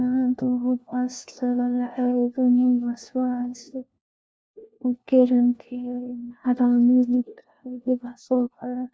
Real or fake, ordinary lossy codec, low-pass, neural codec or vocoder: fake; none; none; codec, 16 kHz, 1 kbps, FunCodec, trained on LibriTTS, 50 frames a second